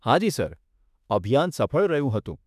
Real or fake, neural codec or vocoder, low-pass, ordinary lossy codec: fake; autoencoder, 48 kHz, 32 numbers a frame, DAC-VAE, trained on Japanese speech; 14.4 kHz; none